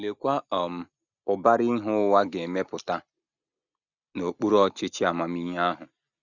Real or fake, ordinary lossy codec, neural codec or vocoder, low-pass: real; none; none; 7.2 kHz